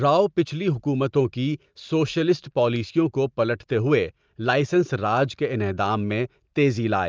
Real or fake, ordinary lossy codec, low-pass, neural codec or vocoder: real; Opus, 24 kbps; 7.2 kHz; none